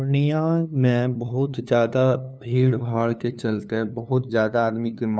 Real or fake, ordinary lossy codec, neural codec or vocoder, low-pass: fake; none; codec, 16 kHz, 2 kbps, FunCodec, trained on LibriTTS, 25 frames a second; none